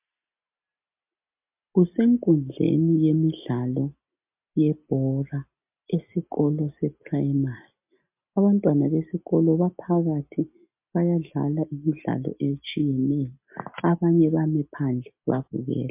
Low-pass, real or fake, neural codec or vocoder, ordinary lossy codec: 3.6 kHz; real; none; MP3, 32 kbps